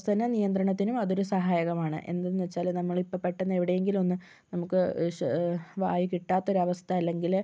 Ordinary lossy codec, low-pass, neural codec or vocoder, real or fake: none; none; none; real